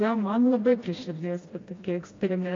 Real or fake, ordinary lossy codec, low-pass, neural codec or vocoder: fake; AAC, 32 kbps; 7.2 kHz; codec, 16 kHz, 1 kbps, FreqCodec, smaller model